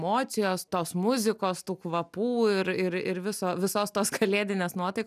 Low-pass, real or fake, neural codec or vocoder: 14.4 kHz; real; none